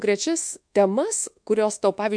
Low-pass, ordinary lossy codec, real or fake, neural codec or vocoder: 9.9 kHz; MP3, 64 kbps; fake; codec, 24 kHz, 1.2 kbps, DualCodec